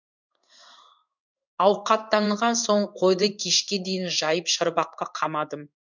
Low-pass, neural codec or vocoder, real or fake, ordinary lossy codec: 7.2 kHz; codec, 16 kHz in and 24 kHz out, 1 kbps, XY-Tokenizer; fake; none